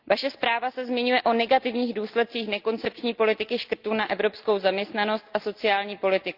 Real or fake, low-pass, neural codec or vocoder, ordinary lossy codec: real; 5.4 kHz; none; Opus, 32 kbps